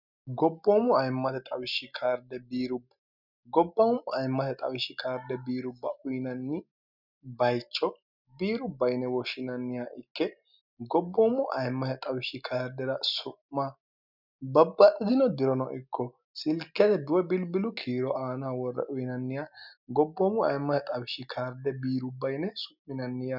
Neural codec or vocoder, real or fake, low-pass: none; real; 5.4 kHz